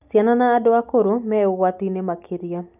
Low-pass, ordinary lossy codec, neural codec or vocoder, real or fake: 3.6 kHz; none; none; real